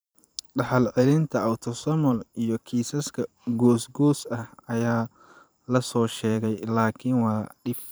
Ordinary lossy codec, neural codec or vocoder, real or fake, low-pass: none; vocoder, 44.1 kHz, 128 mel bands every 512 samples, BigVGAN v2; fake; none